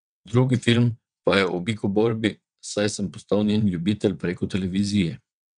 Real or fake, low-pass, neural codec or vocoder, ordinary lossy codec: fake; 9.9 kHz; vocoder, 22.05 kHz, 80 mel bands, WaveNeXt; none